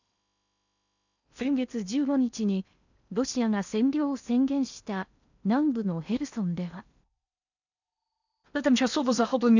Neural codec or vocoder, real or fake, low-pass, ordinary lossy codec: codec, 16 kHz in and 24 kHz out, 0.8 kbps, FocalCodec, streaming, 65536 codes; fake; 7.2 kHz; none